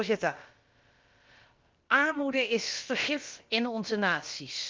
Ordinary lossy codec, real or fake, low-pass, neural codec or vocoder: Opus, 32 kbps; fake; 7.2 kHz; codec, 16 kHz, about 1 kbps, DyCAST, with the encoder's durations